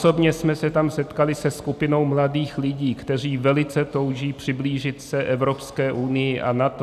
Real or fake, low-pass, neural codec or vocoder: real; 14.4 kHz; none